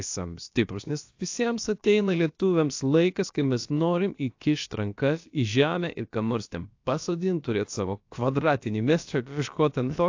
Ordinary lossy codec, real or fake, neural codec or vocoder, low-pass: AAC, 48 kbps; fake; codec, 16 kHz, about 1 kbps, DyCAST, with the encoder's durations; 7.2 kHz